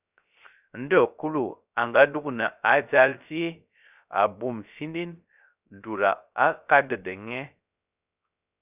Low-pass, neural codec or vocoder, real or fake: 3.6 kHz; codec, 16 kHz, 0.3 kbps, FocalCodec; fake